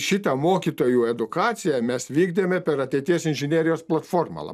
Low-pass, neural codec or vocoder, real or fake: 14.4 kHz; none; real